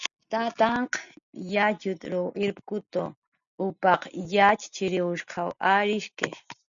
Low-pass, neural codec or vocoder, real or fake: 7.2 kHz; none; real